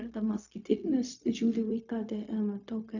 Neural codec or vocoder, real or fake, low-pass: codec, 16 kHz, 0.4 kbps, LongCat-Audio-Codec; fake; 7.2 kHz